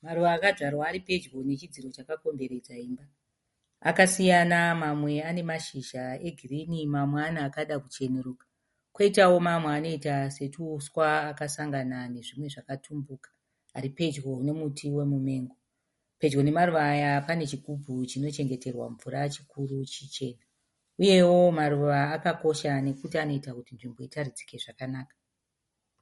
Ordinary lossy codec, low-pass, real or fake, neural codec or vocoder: MP3, 48 kbps; 19.8 kHz; real; none